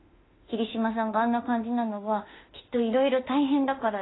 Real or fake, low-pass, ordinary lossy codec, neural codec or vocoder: fake; 7.2 kHz; AAC, 16 kbps; autoencoder, 48 kHz, 32 numbers a frame, DAC-VAE, trained on Japanese speech